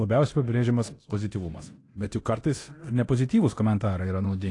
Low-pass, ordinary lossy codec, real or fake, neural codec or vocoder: 10.8 kHz; AAC, 48 kbps; fake; codec, 24 kHz, 0.9 kbps, DualCodec